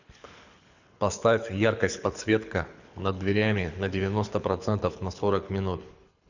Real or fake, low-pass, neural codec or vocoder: fake; 7.2 kHz; codec, 24 kHz, 6 kbps, HILCodec